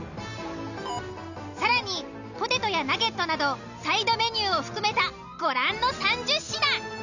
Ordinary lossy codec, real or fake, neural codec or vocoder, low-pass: none; real; none; 7.2 kHz